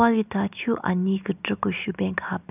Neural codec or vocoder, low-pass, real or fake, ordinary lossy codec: none; 3.6 kHz; real; none